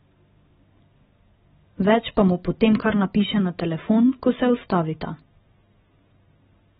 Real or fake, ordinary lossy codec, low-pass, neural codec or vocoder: real; AAC, 16 kbps; 19.8 kHz; none